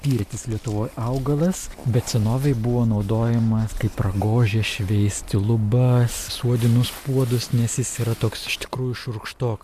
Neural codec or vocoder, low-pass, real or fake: none; 14.4 kHz; real